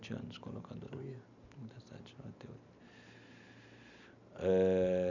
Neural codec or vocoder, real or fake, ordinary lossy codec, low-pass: none; real; none; 7.2 kHz